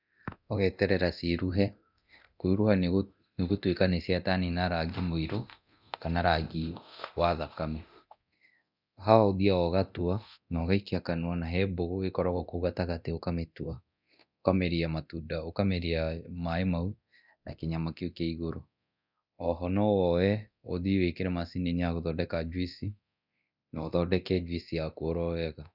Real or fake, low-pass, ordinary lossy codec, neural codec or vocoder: fake; 5.4 kHz; none; codec, 24 kHz, 0.9 kbps, DualCodec